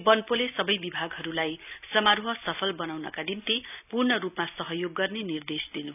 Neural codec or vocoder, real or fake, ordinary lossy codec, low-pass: none; real; none; 3.6 kHz